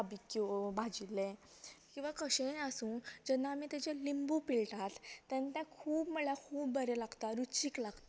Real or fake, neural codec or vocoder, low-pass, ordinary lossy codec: real; none; none; none